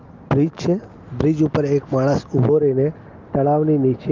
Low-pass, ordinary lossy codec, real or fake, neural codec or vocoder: 7.2 kHz; Opus, 32 kbps; real; none